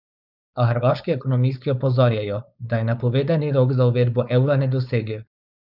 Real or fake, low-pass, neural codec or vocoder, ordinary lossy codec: fake; 5.4 kHz; codec, 16 kHz, 4.8 kbps, FACodec; none